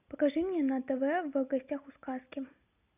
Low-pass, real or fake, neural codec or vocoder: 3.6 kHz; real; none